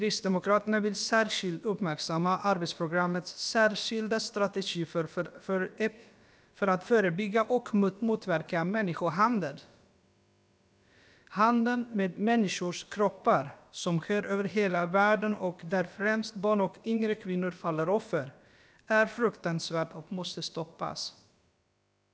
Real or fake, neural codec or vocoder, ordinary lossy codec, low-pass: fake; codec, 16 kHz, about 1 kbps, DyCAST, with the encoder's durations; none; none